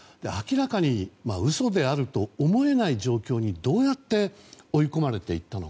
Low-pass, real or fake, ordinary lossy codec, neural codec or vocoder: none; real; none; none